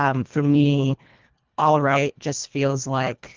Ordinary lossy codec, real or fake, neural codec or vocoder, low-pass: Opus, 32 kbps; fake; codec, 24 kHz, 1.5 kbps, HILCodec; 7.2 kHz